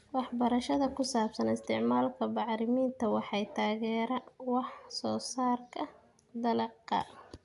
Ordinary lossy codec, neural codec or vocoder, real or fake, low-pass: AAC, 96 kbps; none; real; 10.8 kHz